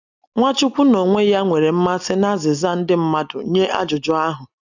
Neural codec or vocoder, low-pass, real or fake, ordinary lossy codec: none; 7.2 kHz; real; none